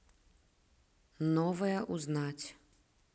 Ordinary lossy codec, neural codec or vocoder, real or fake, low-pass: none; none; real; none